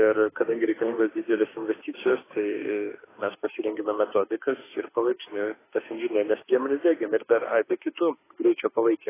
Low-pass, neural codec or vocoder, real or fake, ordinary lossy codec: 3.6 kHz; autoencoder, 48 kHz, 32 numbers a frame, DAC-VAE, trained on Japanese speech; fake; AAC, 16 kbps